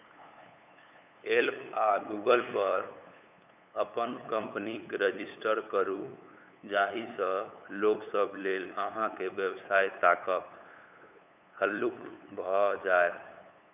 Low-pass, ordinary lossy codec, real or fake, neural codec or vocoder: 3.6 kHz; none; fake; codec, 16 kHz, 8 kbps, FunCodec, trained on LibriTTS, 25 frames a second